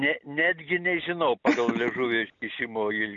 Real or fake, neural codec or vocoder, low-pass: real; none; 7.2 kHz